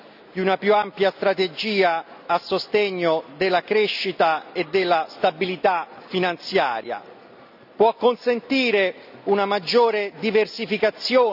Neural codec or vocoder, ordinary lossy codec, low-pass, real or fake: none; none; 5.4 kHz; real